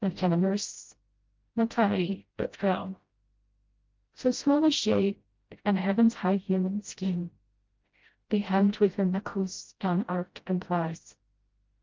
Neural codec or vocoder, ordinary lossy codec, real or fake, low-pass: codec, 16 kHz, 0.5 kbps, FreqCodec, smaller model; Opus, 32 kbps; fake; 7.2 kHz